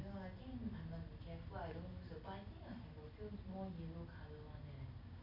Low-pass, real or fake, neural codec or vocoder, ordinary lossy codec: 5.4 kHz; real; none; none